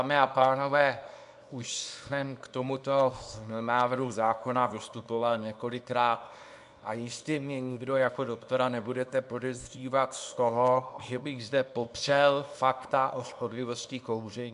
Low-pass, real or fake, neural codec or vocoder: 10.8 kHz; fake; codec, 24 kHz, 0.9 kbps, WavTokenizer, small release